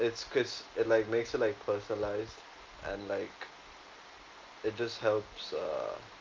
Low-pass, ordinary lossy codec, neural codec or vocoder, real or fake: 7.2 kHz; Opus, 32 kbps; none; real